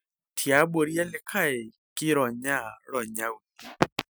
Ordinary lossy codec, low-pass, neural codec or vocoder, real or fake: none; none; none; real